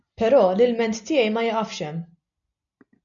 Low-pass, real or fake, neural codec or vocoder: 7.2 kHz; real; none